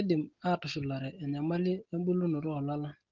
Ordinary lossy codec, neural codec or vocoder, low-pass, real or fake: Opus, 16 kbps; none; 7.2 kHz; real